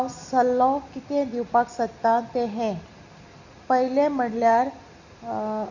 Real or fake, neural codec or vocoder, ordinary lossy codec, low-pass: real; none; none; 7.2 kHz